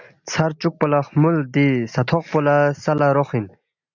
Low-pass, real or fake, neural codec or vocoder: 7.2 kHz; real; none